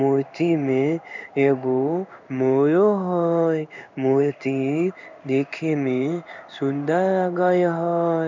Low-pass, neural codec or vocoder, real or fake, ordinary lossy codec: 7.2 kHz; codec, 16 kHz in and 24 kHz out, 1 kbps, XY-Tokenizer; fake; none